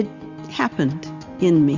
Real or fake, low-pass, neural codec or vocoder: real; 7.2 kHz; none